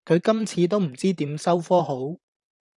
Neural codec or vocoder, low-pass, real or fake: vocoder, 44.1 kHz, 128 mel bands, Pupu-Vocoder; 10.8 kHz; fake